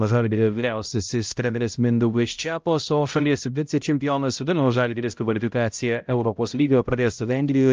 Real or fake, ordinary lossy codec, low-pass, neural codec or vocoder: fake; Opus, 24 kbps; 7.2 kHz; codec, 16 kHz, 0.5 kbps, X-Codec, HuBERT features, trained on balanced general audio